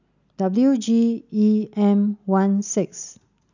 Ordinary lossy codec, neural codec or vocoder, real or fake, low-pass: none; none; real; 7.2 kHz